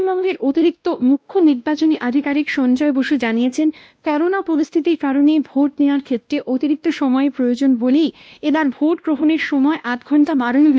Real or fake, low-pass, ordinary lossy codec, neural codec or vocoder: fake; none; none; codec, 16 kHz, 1 kbps, X-Codec, WavLM features, trained on Multilingual LibriSpeech